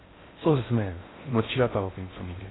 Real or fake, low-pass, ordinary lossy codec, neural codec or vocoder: fake; 7.2 kHz; AAC, 16 kbps; codec, 16 kHz in and 24 kHz out, 0.6 kbps, FocalCodec, streaming, 4096 codes